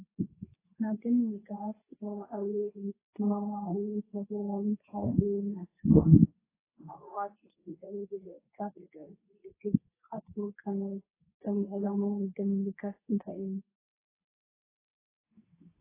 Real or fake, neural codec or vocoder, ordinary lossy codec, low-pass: fake; codec, 24 kHz, 0.9 kbps, WavTokenizer, medium speech release version 2; AAC, 16 kbps; 3.6 kHz